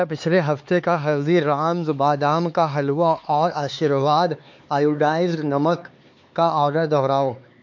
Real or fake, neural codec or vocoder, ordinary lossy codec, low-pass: fake; codec, 16 kHz, 4 kbps, X-Codec, HuBERT features, trained on LibriSpeech; MP3, 48 kbps; 7.2 kHz